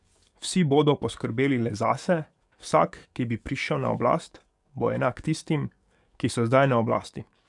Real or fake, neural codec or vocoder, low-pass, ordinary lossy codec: fake; codec, 44.1 kHz, 7.8 kbps, DAC; 10.8 kHz; none